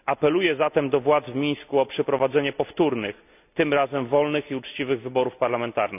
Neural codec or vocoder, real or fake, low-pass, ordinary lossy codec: none; real; 3.6 kHz; none